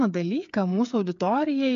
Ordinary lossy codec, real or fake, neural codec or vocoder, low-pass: MP3, 64 kbps; fake; codec, 16 kHz, 8 kbps, FreqCodec, smaller model; 7.2 kHz